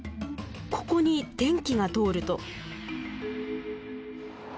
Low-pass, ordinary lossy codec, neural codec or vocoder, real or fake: none; none; none; real